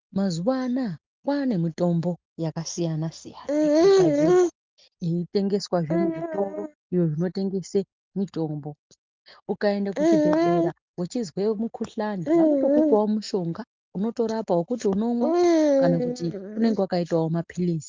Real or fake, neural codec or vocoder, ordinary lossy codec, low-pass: real; none; Opus, 24 kbps; 7.2 kHz